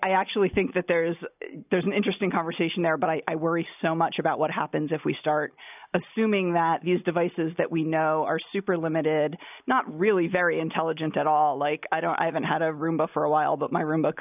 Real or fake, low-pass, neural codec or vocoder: real; 3.6 kHz; none